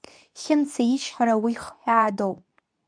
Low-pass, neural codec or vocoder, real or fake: 9.9 kHz; codec, 24 kHz, 0.9 kbps, WavTokenizer, medium speech release version 2; fake